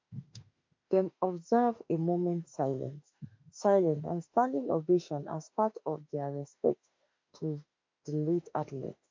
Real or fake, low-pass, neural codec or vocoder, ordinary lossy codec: fake; 7.2 kHz; autoencoder, 48 kHz, 32 numbers a frame, DAC-VAE, trained on Japanese speech; MP3, 48 kbps